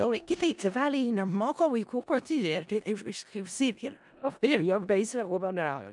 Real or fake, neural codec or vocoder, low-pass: fake; codec, 16 kHz in and 24 kHz out, 0.4 kbps, LongCat-Audio-Codec, four codebook decoder; 10.8 kHz